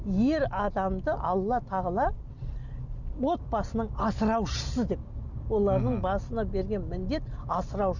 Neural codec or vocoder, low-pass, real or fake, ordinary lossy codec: none; 7.2 kHz; real; none